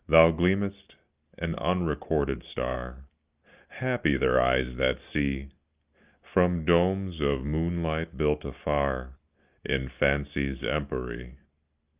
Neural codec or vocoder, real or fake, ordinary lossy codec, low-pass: none; real; Opus, 32 kbps; 3.6 kHz